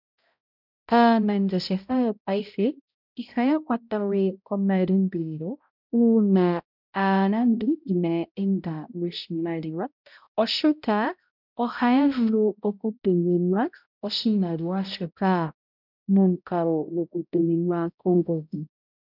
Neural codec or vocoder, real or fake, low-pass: codec, 16 kHz, 0.5 kbps, X-Codec, HuBERT features, trained on balanced general audio; fake; 5.4 kHz